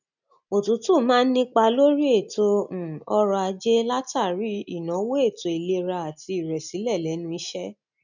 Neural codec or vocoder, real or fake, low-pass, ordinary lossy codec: none; real; 7.2 kHz; none